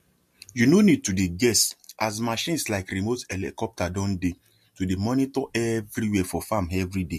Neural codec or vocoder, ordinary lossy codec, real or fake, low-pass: none; MP3, 64 kbps; real; 14.4 kHz